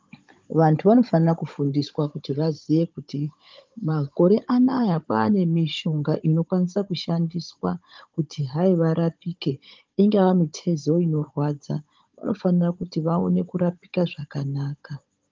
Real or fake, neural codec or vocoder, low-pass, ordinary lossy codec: fake; codec, 16 kHz, 16 kbps, FunCodec, trained on Chinese and English, 50 frames a second; 7.2 kHz; Opus, 24 kbps